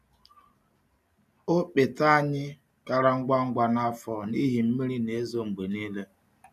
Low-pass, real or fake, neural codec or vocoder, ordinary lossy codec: 14.4 kHz; real; none; none